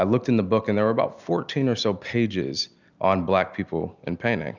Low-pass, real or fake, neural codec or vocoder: 7.2 kHz; real; none